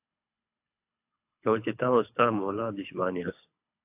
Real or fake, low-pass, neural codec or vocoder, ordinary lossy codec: fake; 3.6 kHz; codec, 24 kHz, 3 kbps, HILCodec; AAC, 32 kbps